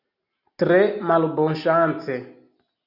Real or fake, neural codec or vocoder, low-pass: real; none; 5.4 kHz